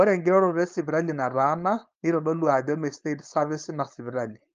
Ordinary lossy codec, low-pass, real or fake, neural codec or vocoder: Opus, 24 kbps; 7.2 kHz; fake; codec, 16 kHz, 4.8 kbps, FACodec